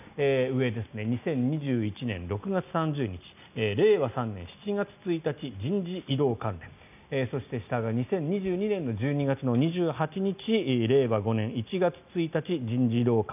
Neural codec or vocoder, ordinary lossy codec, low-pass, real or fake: none; none; 3.6 kHz; real